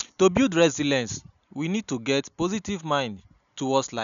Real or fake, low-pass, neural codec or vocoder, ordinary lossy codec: real; 7.2 kHz; none; none